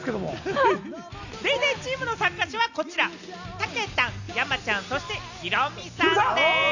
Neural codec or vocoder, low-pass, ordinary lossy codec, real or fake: none; 7.2 kHz; none; real